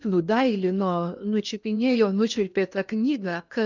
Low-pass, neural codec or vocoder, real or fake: 7.2 kHz; codec, 16 kHz in and 24 kHz out, 0.8 kbps, FocalCodec, streaming, 65536 codes; fake